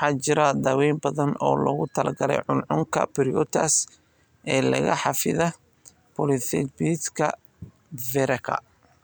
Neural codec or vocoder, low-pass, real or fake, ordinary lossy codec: none; none; real; none